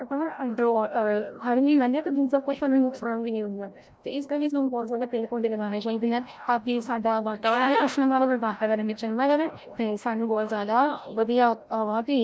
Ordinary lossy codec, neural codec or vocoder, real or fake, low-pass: none; codec, 16 kHz, 0.5 kbps, FreqCodec, larger model; fake; none